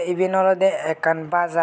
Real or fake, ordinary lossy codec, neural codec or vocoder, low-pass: real; none; none; none